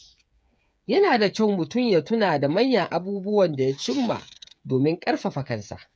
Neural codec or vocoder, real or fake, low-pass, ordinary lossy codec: codec, 16 kHz, 8 kbps, FreqCodec, smaller model; fake; none; none